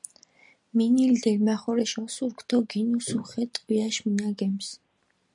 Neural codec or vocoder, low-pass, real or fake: none; 10.8 kHz; real